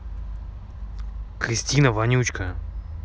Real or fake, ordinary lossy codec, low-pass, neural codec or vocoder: real; none; none; none